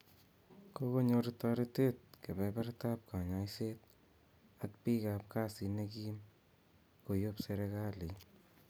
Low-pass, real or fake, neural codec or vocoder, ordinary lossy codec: none; real; none; none